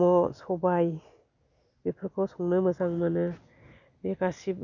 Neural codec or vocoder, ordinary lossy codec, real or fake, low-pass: none; none; real; 7.2 kHz